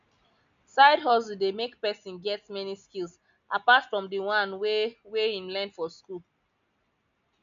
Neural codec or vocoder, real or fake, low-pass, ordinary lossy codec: none; real; 7.2 kHz; none